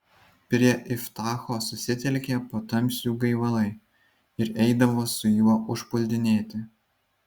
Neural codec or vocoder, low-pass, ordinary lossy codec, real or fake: none; 19.8 kHz; Opus, 64 kbps; real